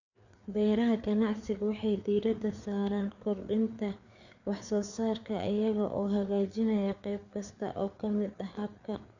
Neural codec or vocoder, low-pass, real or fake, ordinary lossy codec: codec, 16 kHz, 4 kbps, FreqCodec, larger model; 7.2 kHz; fake; none